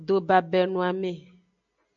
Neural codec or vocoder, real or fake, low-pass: none; real; 7.2 kHz